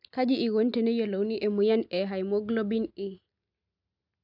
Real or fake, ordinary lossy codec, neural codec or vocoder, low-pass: real; none; none; 5.4 kHz